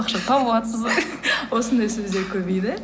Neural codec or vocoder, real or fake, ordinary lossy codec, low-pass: none; real; none; none